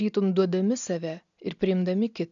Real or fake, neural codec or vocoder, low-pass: real; none; 7.2 kHz